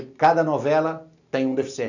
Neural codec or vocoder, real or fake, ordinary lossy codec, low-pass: none; real; none; 7.2 kHz